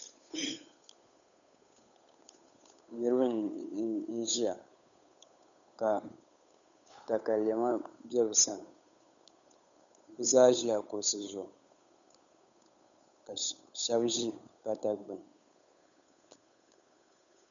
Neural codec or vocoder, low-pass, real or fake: codec, 16 kHz, 8 kbps, FunCodec, trained on Chinese and English, 25 frames a second; 7.2 kHz; fake